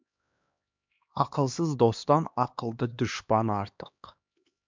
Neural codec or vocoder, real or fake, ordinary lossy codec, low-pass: codec, 16 kHz, 2 kbps, X-Codec, HuBERT features, trained on LibriSpeech; fake; MP3, 64 kbps; 7.2 kHz